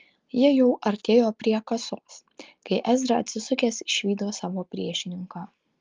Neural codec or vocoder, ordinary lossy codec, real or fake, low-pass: none; Opus, 24 kbps; real; 7.2 kHz